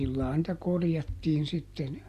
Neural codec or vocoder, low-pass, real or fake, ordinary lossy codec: none; 14.4 kHz; real; Opus, 64 kbps